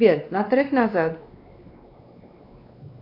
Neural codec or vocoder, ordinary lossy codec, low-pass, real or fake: codec, 16 kHz, 2 kbps, X-Codec, WavLM features, trained on Multilingual LibriSpeech; AAC, 32 kbps; 5.4 kHz; fake